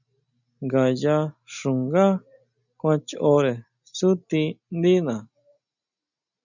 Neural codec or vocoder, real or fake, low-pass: none; real; 7.2 kHz